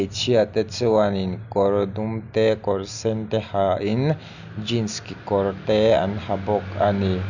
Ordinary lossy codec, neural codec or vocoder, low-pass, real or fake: none; none; 7.2 kHz; real